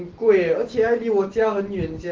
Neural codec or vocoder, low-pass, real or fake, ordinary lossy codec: none; 7.2 kHz; real; Opus, 16 kbps